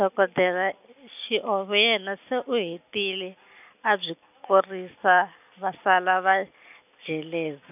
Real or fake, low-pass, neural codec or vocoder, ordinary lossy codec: real; 3.6 kHz; none; none